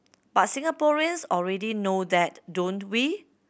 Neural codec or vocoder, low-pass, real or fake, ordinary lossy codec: none; none; real; none